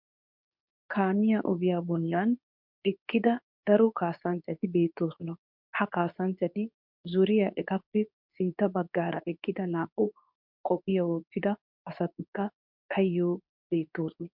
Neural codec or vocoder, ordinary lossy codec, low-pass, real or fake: codec, 24 kHz, 0.9 kbps, WavTokenizer, medium speech release version 2; MP3, 48 kbps; 5.4 kHz; fake